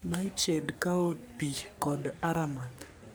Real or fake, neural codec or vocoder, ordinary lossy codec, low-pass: fake; codec, 44.1 kHz, 3.4 kbps, Pupu-Codec; none; none